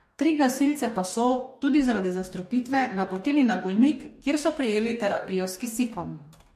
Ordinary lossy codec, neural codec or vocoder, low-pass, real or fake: MP3, 64 kbps; codec, 44.1 kHz, 2.6 kbps, DAC; 14.4 kHz; fake